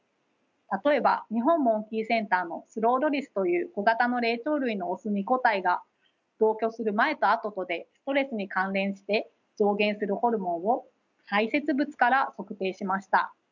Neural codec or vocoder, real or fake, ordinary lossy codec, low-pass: none; real; none; 7.2 kHz